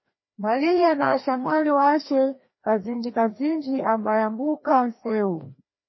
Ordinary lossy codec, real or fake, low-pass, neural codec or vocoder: MP3, 24 kbps; fake; 7.2 kHz; codec, 16 kHz, 1 kbps, FreqCodec, larger model